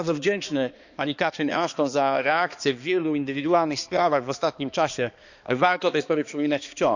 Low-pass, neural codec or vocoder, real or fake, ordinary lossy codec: 7.2 kHz; codec, 16 kHz, 2 kbps, X-Codec, HuBERT features, trained on balanced general audio; fake; none